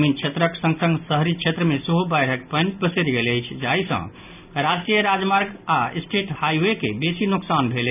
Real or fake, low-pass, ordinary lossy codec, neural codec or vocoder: real; 3.6 kHz; none; none